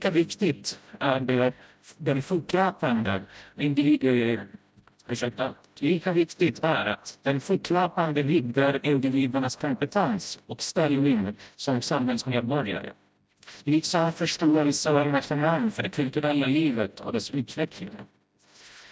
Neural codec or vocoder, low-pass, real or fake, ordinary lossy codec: codec, 16 kHz, 0.5 kbps, FreqCodec, smaller model; none; fake; none